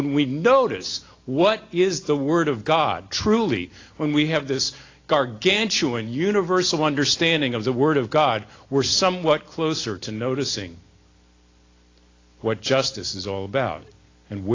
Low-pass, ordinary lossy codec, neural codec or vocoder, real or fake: 7.2 kHz; AAC, 32 kbps; none; real